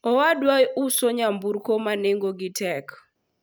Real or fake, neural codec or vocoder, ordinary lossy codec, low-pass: real; none; none; none